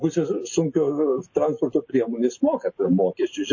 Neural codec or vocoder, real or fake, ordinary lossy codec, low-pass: none; real; MP3, 32 kbps; 7.2 kHz